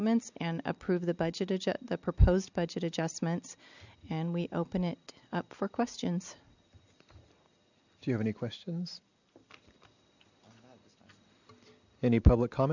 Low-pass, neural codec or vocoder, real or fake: 7.2 kHz; none; real